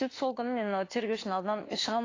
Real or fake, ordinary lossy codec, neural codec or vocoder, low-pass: fake; AAC, 32 kbps; autoencoder, 48 kHz, 32 numbers a frame, DAC-VAE, trained on Japanese speech; 7.2 kHz